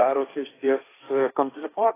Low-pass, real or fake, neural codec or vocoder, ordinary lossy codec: 3.6 kHz; fake; codec, 16 kHz, 1.1 kbps, Voila-Tokenizer; AAC, 16 kbps